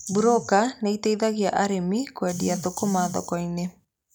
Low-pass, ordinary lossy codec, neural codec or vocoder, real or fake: none; none; none; real